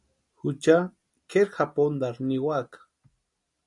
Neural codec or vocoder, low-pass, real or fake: none; 10.8 kHz; real